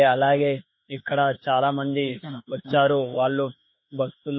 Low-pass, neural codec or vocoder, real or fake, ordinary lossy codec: 7.2 kHz; autoencoder, 48 kHz, 32 numbers a frame, DAC-VAE, trained on Japanese speech; fake; MP3, 24 kbps